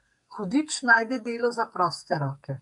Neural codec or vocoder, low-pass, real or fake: codec, 44.1 kHz, 2.6 kbps, SNAC; 10.8 kHz; fake